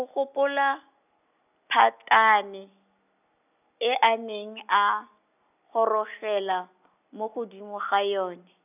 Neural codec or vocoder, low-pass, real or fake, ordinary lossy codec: none; 3.6 kHz; real; none